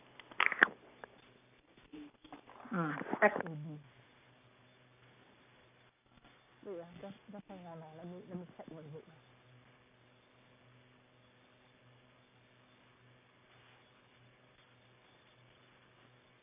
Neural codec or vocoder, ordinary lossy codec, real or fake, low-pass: none; none; real; 3.6 kHz